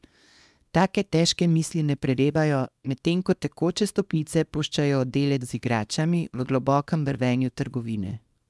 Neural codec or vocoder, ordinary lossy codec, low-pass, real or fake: codec, 24 kHz, 0.9 kbps, WavTokenizer, small release; none; none; fake